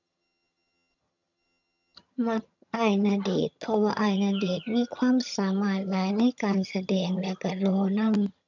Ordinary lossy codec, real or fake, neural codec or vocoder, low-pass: none; fake; vocoder, 22.05 kHz, 80 mel bands, HiFi-GAN; 7.2 kHz